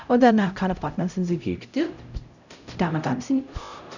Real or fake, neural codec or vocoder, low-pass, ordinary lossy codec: fake; codec, 16 kHz, 0.5 kbps, X-Codec, HuBERT features, trained on LibriSpeech; 7.2 kHz; none